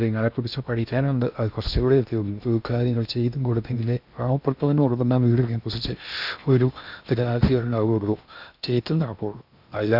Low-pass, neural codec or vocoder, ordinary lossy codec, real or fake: 5.4 kHz; codec, 16 kHz in and 24 kHz out, 0.6 kbps, FocalCodec, streaming, 2048 codes; none; fake